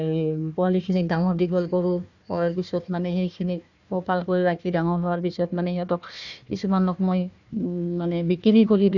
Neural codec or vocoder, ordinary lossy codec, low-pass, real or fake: codec, 16 kHz, 1 kbps, FunCodec, trained on Chinese and English, 50 frames a second; none; 7.2 kHz; fake